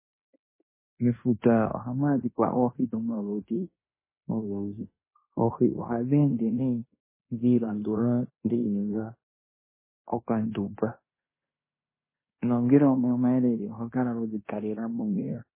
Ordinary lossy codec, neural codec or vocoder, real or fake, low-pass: MP3, 16 kbps; codec, 16 kHz in and 24 kHz out, 0.9 kbps, LongCat-Audio-Codec, fine tuned four codebook decoder; fake; 3.6 kHz